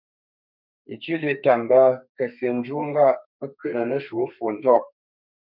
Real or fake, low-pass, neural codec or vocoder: fake; 5.4 kHz; codec, 32 kHz, 1.9 kbps, SNAC